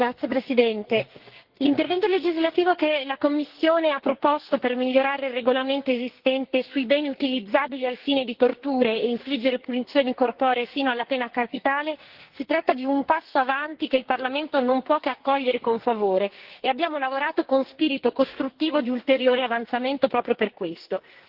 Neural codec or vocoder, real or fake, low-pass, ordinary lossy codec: codec, 44.1 kHz, 2.6 kbps, SNAC; fake; 5.4 kHz; Opus, 16 kbps